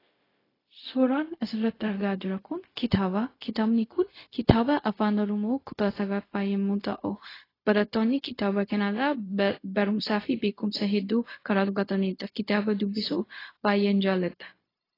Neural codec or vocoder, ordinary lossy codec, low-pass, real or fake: codec, 16 kHz, 0.4 kbps, LongCat-Audio-Codec; AAC, 24 kbps; 5.4 kHz; fake